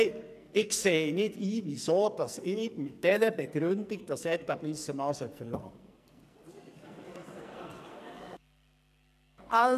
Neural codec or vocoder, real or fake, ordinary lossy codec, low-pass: codec, 44.1 kHz, 2.6 kbps, SNAC; fake; none; 14.4 kHz